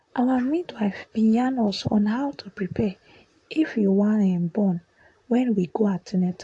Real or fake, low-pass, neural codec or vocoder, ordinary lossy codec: fake; 10.8 kHz; vocoder, 44.1 kHz, 128 mel bands, Pupu-Vocoder; AAC, 48 kbps